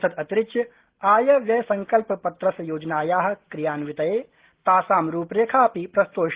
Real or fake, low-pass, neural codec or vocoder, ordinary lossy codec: real; 3.6 kHz; none; Opus, 16 kbps